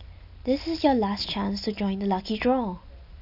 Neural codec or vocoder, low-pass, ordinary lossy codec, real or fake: none; 5.4 kHz; none; real